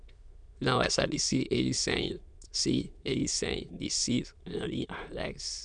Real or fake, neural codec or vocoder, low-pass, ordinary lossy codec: fake; autoencoder, 22.05 kHz, a latent of 192 numbers a frame, VITS, trained on many speakers; 9.9 kHz; none